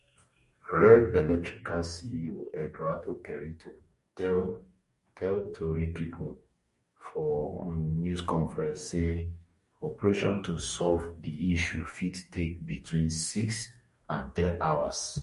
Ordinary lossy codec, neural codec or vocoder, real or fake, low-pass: MP3, 48 kbps; codec, 44.1 kHz, 2.6 kbps, DAC; fake; 14.4 kHz